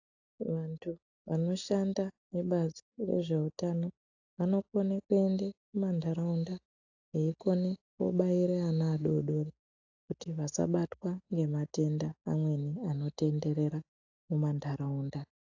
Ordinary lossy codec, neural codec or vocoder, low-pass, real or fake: AAC, 48 kbps; none; 7.2 kHz; real